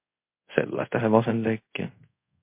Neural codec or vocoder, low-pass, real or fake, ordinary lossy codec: codec, 24 kHz, 0.5 kbps, DualCodec; 3.6 kHz; fake; MP3, 24 kbps